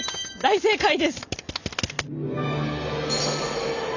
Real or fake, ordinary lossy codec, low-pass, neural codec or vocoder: real; none; 7.2 kHz; none